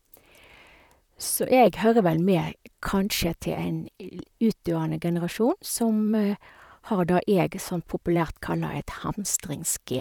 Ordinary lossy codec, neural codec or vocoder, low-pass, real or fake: none; vocoder, 44.1 kHz, 128 mel bands, Pupu-Vocoder; 19.8 kHz; fake